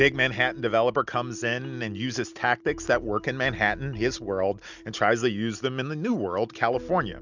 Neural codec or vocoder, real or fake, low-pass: none; real; 7.2 kHz